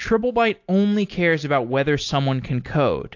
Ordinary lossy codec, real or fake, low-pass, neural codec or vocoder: AAC, 48 kbps; real; 7.2 kHz; none